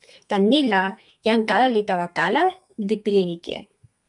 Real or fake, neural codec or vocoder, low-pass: fake; codec, 32 kHz, 1.9 kbps, SNAC; 10.8 kHz